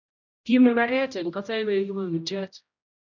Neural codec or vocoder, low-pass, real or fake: codec, 16 kHz, 0.5 kbps, X-Codec, HuBERT features, trained on general audio; 7.2 kHz; fake